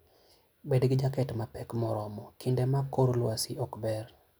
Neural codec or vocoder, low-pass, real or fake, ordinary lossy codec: none; none; real; none